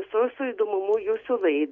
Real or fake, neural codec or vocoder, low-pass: real; none; 7.2 kHz